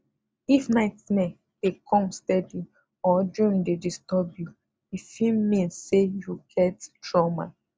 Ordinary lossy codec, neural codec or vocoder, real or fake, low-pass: none; none; real; none